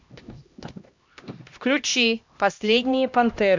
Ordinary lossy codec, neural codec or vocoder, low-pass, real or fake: MP3, 64 kbps; codec, 16 kHz, 1 kbps, X-Codec, HuBERT features, trained on LibriSpeech; 7.2 kHz; fake